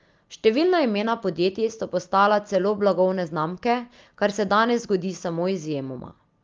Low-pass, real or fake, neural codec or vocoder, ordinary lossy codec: 7.2 kHz; real; none; Opus, 24 kbps